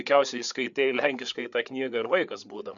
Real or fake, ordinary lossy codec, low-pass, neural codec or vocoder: fake; MP3, 96 kbps; 7.2 kHz; codec, 16 kHz, 4 kbps, FreqCodec, larger model